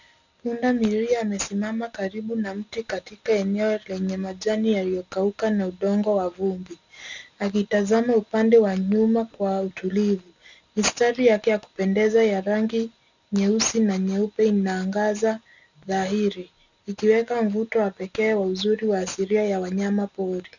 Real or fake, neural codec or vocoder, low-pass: real; none; 7.2 kHz